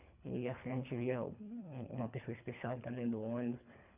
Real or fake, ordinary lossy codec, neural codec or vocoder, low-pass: fake; none; codec, 24 kHz, 3 kbps, HILCodec; 3.6 kHz